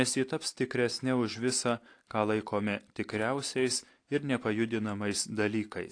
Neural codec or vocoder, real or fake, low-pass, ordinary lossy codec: none; real; 9.9 kHz; AAC, 48 kbps